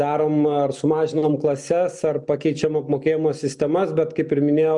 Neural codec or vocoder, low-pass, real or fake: none; 10.8 kHz; real